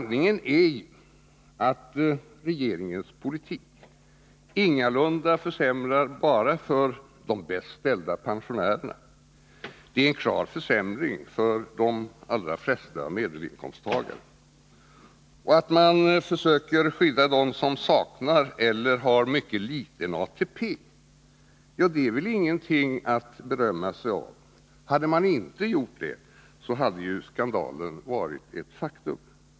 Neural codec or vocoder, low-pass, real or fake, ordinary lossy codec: none; none; real; none